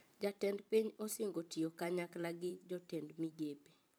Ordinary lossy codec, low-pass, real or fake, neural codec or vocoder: none; none; fake; vocoder, 44.1 kHz, 128 mel bands every 512 samples, BigVGAN v2